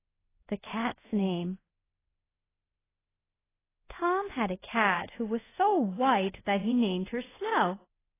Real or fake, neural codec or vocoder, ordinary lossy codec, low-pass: fake; codec, 24 kHz, 0.5 kbps, DualCodec; AAC, 16 kbps; 3.6 kHz